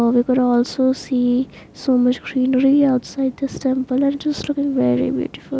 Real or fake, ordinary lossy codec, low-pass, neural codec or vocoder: real; none; none; none